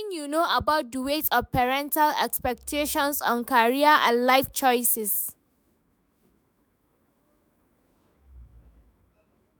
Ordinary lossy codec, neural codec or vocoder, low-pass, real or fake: none; autoencoder, 48 kHz, 128 numbers a frame, DAC-VAE, trained on Japanese speech; none; fake